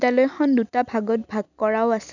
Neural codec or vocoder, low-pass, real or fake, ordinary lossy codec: none; 7.2 kHz; real; none